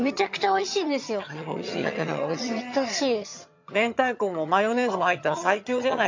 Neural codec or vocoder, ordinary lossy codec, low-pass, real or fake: vocoder, 22.05 kHz, 80 mel bands, HiFi-GAN; MP3, 64 kbps; 7.2 kHz; fake